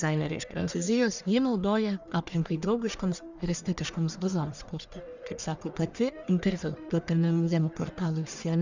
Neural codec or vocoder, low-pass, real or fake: codec, 44.1 kHz, 1.7 kbps, Pupu-Codec; 7.2 kHz; fake